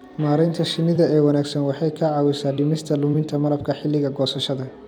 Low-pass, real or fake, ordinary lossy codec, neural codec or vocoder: 19.8 kHz; fake; none; vocoder, 44.1 kHz, 128 mel bands every 256 samples, BigVGAN v2